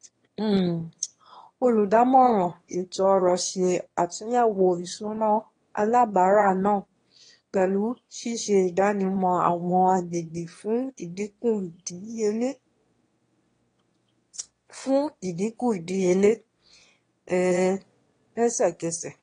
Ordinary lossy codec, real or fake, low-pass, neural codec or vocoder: AAC, 32 kbps; fake; 9.9 kHz; autoencoder, 22.05 kHz, a latent of 192 numbers a frame, VITS, trained on one speaker